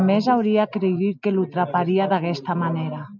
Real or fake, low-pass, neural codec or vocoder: real; 7.2 kHz; none